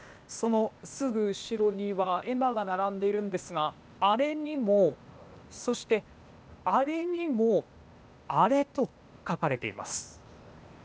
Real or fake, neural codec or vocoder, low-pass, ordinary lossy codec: fake; codec, 16 kHz, 0.8 kbps, ZipCodec; none; none